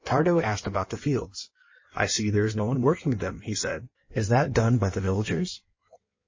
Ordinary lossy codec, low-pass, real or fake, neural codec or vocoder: MP3, 32 kbps; 7.2 kHz; fake; codec, 16 kHz in and 24 kHz out, 1.1 kbps, FireRedTTS-2 codec